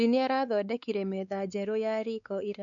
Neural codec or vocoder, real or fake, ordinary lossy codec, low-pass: codec, 16 kHz, 4 kbps, X-Codec, WavLM features, trained on Multilingual LibriSpeech; fake; MP3, 96 kbps; 7.2 kHz